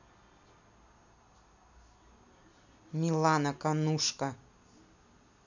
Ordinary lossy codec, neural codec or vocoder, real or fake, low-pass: none; none; real; 7.2 kHz